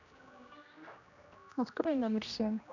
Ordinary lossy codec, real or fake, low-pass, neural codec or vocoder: none; fake; 7.2 kHz; codec, 16 kHz, 1 kbps, X-Codec, HuBERT features, trained on general audio